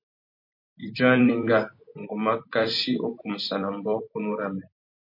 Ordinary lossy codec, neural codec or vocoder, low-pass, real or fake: MP3, 32 kbps; vocoder, 44.1 kHz, 128 mel bands every 256 samples, BigVGAN v2; 5.4 kHz; fake